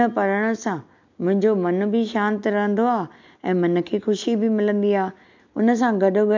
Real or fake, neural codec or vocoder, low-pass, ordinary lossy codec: real; none; 7.2 kHz; MP3, 64 kbps